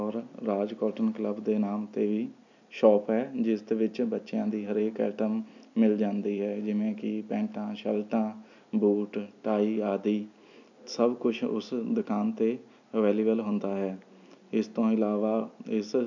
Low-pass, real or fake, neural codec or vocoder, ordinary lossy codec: 7.2 kHz; real; none; none